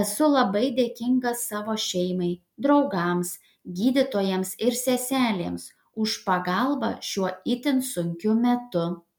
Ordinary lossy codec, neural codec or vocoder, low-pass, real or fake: MP3, 96 kbps; none; 19.8 kHz; real